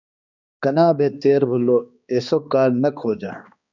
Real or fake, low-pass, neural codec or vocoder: fake; 7.2 kHz; codec, 16 kHz, 2 kbps, X-Codec, HuBERT features, trained on balanced general audio